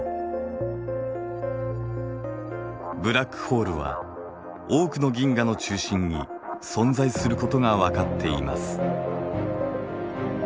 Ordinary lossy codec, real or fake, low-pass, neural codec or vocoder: none; real; none; none